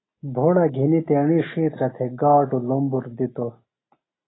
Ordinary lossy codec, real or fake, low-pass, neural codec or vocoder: AAC, 16 kbps; real; 7.2 kHz; none